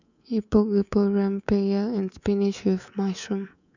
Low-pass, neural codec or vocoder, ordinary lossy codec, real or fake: 7.2 kHz; codec, 24 kHz, 3.1 kbps, DualCodec; none; fake